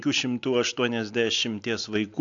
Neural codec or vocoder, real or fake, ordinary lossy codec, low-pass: none; real; AAC, 64 kbps; 7.2 kHz